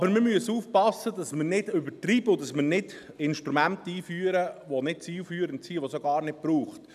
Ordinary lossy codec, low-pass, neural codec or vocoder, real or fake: none; 14.4 kHz; none; real